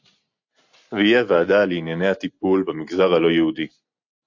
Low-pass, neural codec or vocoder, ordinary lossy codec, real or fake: 7.2 kHz; none; AAC, 48 kbps; real